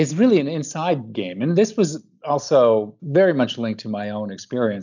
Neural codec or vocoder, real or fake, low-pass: none; real; 7.2 kHz